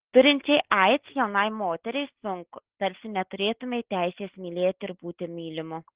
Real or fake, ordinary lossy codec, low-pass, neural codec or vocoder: real; Opus, 16 kbps; 3.6 kHz; none